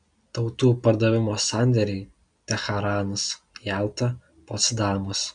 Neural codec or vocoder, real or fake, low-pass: none; real; 9.9 kHz